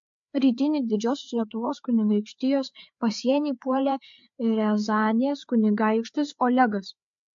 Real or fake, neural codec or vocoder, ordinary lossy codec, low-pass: fake; codec, 16 kHz, 4 kbps, FreqCodec, larger model; MP3, 48 kbps; 7.2 kHz